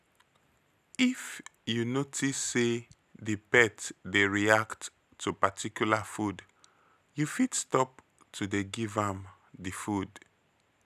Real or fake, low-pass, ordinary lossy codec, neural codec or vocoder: real; 14.4 kHz; none; none